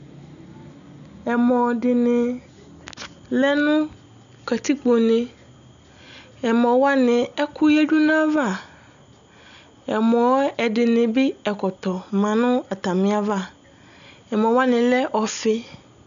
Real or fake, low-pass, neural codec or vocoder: real; 7.2 kHz; none